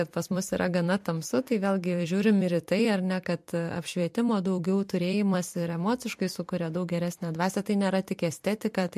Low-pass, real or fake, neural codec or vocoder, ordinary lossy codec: 14.4 kHz; fake; vocoder, 44.1 kHz, 128 mel bands every 256 samples, BigVGAN v2; MP3, 64 kbps